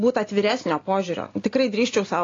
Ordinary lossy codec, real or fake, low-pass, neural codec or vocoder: AAC, 32 kbps; real; 7.2 kHz; none